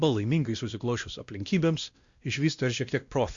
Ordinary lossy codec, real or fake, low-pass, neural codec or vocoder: Opus, 64 kbps; fake; 7.2 kHz; codec, 16 kHz, 1 kbps, X-Codec, WavLM features, trained on Multilingual LibriSpeech